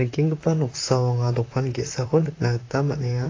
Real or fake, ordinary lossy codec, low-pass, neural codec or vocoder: fake; AAC, 32 kbps; 7.2 kHz; vocoder, 44.1 kHz, 128 mel bands, Pupu-Vocoder